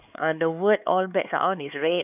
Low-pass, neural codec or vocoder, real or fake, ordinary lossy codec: 3.6 kHz; codec, 16 kHz, 4 kbps, X-Codec, HuBERT features, trained on LibriSpeech; fake; none